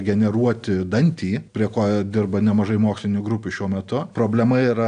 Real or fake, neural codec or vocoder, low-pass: real; none; 9.9 kHz